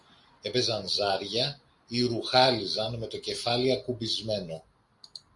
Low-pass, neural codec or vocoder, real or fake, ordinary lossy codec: 10.8 kHz; none; real; Opus, 64 kbps